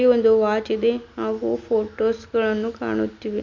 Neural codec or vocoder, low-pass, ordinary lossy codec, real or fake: none; 7.2 kHz; MP3, 48 kbps; real